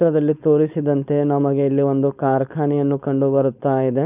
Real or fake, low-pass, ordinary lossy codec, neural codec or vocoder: fake; 3.6 kHz; none; codec, 16 kHz, 4.8 kbps, FACodec